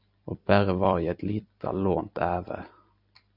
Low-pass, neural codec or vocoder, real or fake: 5.4 kHz; vocoder, 44.1 kHz, 128 mel bands every 256 samples, BigVGAN v2; fake